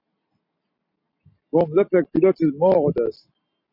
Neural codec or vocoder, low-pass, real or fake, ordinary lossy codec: none; 5.4 kHz; real; MP3, 32 kbps